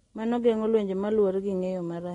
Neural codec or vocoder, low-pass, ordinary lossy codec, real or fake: none; 10.8 kHz; AAC, 32 kbps; real